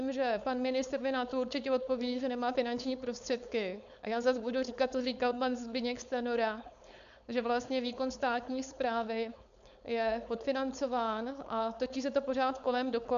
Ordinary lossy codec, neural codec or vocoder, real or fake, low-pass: MP3, 96 kbps; codec, 16 kHz, 4.8 kbps, FACodec; fake; 7.2 kHz